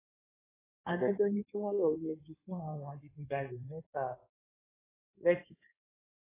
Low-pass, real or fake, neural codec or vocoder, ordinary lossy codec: 3.6 kHz; fake; codec, 16 kHz in and 24 kHz out, 1.1 kbps, FireRedTTS-2 codec; AAC, 16 kbps